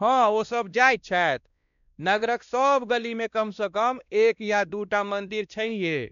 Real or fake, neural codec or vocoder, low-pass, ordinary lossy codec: fake; codec, 16 kHz, 1 kbps, X-Codec, WavLM features, trained on Multilingual LibriSpeech; 7.2 kHz; none